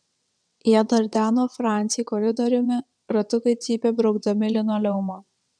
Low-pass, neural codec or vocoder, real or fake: 9.9 kHz; vocoder, 22.05 kHz, 80 mel bands, WaveNeXt; fake